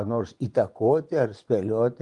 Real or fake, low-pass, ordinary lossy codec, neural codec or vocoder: fake; 10.8 kHz; Opus, 32 kbps; vocoder, 24 kHz, 100 mel bands, Vocos